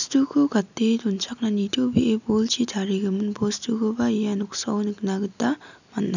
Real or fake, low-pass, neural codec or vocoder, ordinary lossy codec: real; 7.2 kHz; none; none